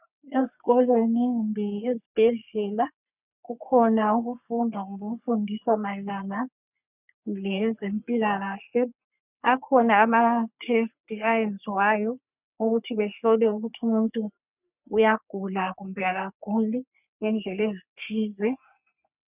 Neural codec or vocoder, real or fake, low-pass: codec, 44.1 kHz, 3.4 kbps, Pupu-Codec; fake; 3.6 kHz